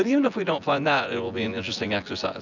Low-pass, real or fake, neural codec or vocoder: 7.2 kHz; fake; vocoder, 24 kHz, 100 mel bands, Vocos